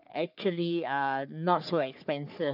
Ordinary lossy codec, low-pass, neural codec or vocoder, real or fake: none; 5.4 kHz; codec, 44.1 kHz, 3.4 kbps, Pupu-Codec; fake